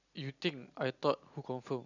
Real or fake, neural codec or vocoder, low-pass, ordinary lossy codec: real; none; 7.2 kHz; none